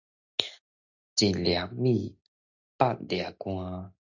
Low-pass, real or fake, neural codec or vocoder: 7.2 kHz; real; none